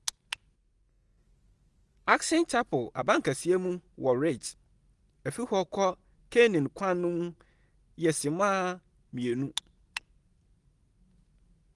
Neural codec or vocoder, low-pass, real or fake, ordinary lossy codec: none; 10.8 kHz; real; Opus, 24 kbps